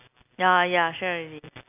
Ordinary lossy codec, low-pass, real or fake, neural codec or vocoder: none; 3.6 kHz; real; none